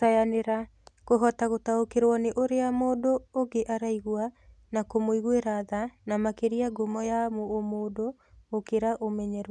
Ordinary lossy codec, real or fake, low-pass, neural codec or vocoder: none; real; none; none